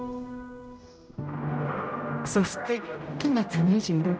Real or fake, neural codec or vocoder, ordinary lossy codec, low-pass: fake; codec, 16 kHz, 0.5 kbps, X-Codec, HuBERT features, trained on general audio; none; none